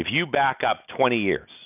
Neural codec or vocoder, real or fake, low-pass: none; real; 3.6 kHz